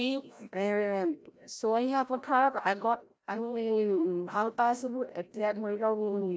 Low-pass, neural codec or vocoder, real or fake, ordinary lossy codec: none; codec, 16 kHz, 0.5 kbps, FreqCodec, larger model; fake; none